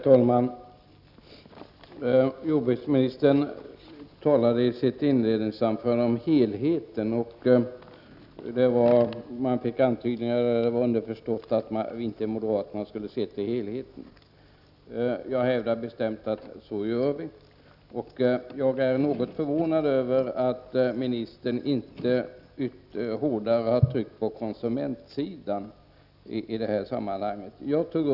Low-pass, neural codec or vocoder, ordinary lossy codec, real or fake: 5.4 kHz; none; none; real